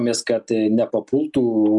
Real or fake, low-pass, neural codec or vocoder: real; 10.8 kHz; none